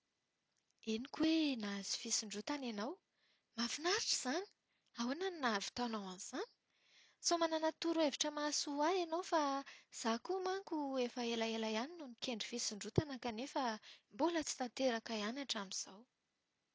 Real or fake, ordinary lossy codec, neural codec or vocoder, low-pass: real; none; none; none